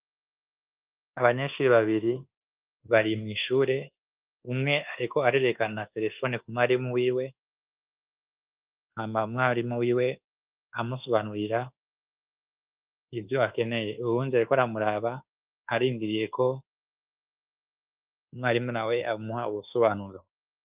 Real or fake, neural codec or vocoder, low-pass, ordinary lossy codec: fake; codec, 24 kHz, 1.2 kbps, DualCodec; 3.6 kHz; Opus, 32 kbps